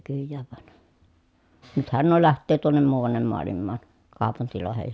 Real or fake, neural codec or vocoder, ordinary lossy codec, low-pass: real; none; none; none